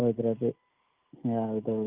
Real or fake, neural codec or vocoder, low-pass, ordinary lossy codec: real; none; 3.6 kHz; Opus, 32 kbps